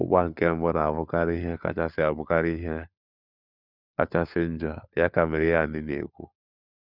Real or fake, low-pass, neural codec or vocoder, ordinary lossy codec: fake; 5.4 kHz; codec, 16 kHz, 4 kbps, FunCodec, trained on LibriTTS, 50 frames a second; none